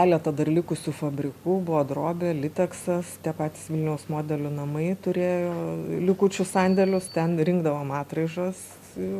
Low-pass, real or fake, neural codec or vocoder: 14.4 kHz; real; none